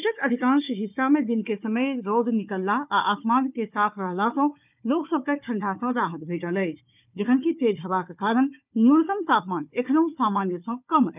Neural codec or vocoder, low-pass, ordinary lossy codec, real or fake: codec, 16 kHz, 4 kbps, FunCodec, trained on Chinese and English, 50 frames a second; 3.6 kHz; none; fake